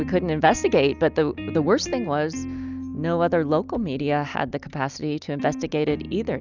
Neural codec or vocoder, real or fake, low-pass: none; real; 7.2 kHz